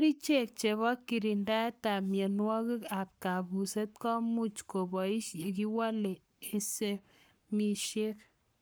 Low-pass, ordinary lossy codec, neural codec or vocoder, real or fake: none; none; codec, 44.1 kHz, 7.8 kbps, Pupu-Codec; fake